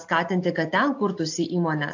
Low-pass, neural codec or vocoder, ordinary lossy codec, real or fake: 7.2 kHz; none; AAC, 48 kbps; real